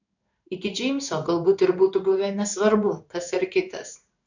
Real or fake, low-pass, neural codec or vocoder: fake; 7.2 kHz; codec, 16 kHz in and 24 kHz out, 1 kbps, XY-Tokenizer